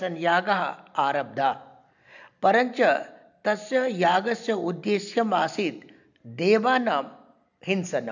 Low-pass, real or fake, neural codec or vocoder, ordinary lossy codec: 7.2 kHz; real; none; none